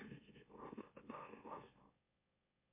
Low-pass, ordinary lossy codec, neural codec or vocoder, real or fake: 3.6 kHz; MP3, 24 kbps; autoencoder, 44.1 kHz, a latent of 192 numbers a frame, MeloTTS; fake